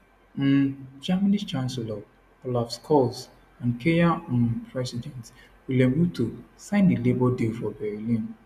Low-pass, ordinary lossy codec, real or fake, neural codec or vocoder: 14.4 kHz; none; real; none